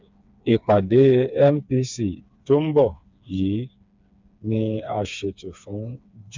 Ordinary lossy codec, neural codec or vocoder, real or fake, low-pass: MP3, 64 kbps; codec, 16 kHz, 4 kbps, FreqCodec, smaller model; fake; 7.2 kHz